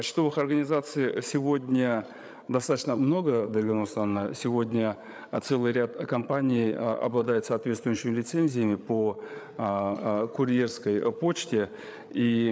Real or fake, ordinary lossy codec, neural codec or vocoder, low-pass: fake; none; codec, 16 kHz, 8 kbps, FreqCodec, larger model; none